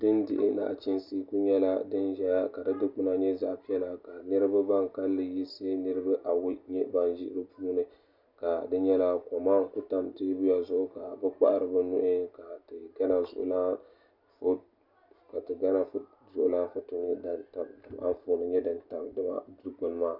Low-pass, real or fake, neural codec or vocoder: 5.4 kHz; real; none